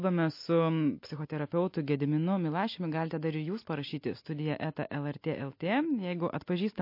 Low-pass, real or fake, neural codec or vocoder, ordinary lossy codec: 5.4 kHz; real; none; MP3, 32 kbps